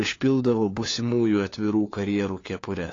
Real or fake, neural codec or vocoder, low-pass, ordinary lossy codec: fake; codec, 16 kHz, 4 kbps, FunCodec, trained on LibriTTS, 50 frames a second; 7.2 kHz; AAC, 32 kbps